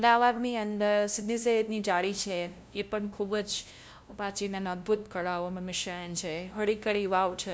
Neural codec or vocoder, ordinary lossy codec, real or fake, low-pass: codec, 16 kHz, 0.5 kbps, FunCodec, trained on LibriTTS, 25 frames a second; none; fake; none